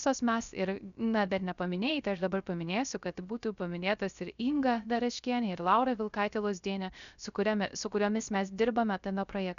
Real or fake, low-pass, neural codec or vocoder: fake; 7.2 kHz; codec, 16 kHz, 0.3 kbps, FocalCodec